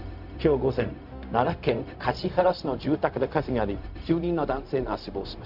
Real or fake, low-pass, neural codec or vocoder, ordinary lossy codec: fake; 5.4 kHz; codec, 16 kHz, 0.4 kbps, LongCat-Audio-Codec; none